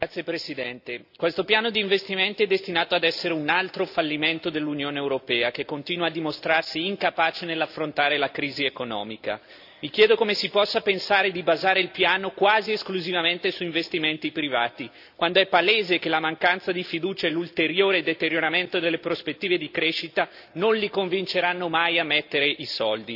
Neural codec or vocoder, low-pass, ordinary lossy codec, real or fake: none; 5.4 kHz; AAC, 48 kbps; real